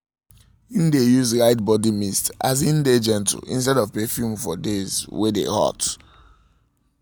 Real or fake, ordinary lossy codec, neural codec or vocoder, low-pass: real; none; none; none